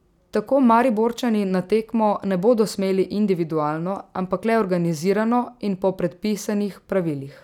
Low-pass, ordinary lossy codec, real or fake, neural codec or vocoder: 19.8 kHz; none; real; none